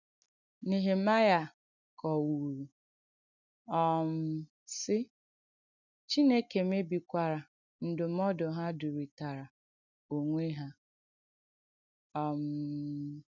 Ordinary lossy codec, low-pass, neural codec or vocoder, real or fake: none; 7.2 kHz; none; real